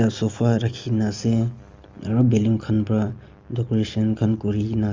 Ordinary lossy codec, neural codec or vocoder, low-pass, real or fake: Opus, 32 kbps; none; 7.2 kHz; real